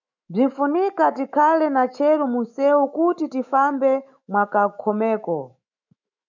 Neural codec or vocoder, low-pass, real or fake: autoencoder, 48 kHz, 128 numbers a frame, DAC-VAE, trained on Japanese speech; 7.2 kHz; fake